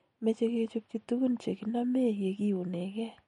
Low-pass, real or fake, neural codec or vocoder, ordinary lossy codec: 19.8 kHz; real; none; MP3, 48 kbps